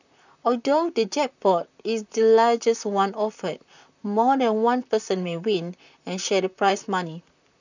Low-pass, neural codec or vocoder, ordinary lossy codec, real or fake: 7.2 kHz; vocoder, 44.1 kHz, 128 mel bands, Pupu-Vocoder; none; fake